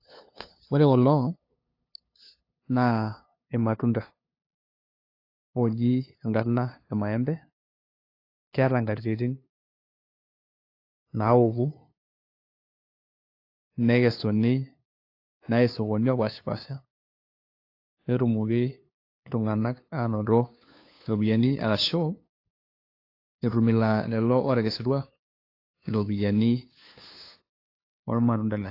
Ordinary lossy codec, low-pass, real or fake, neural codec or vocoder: AAC, 32 kbps; 5.4 kHz; fake; codec, 16 kHz, 2 kbps, FunCodec, trained on LibriTTS, 25 frames a second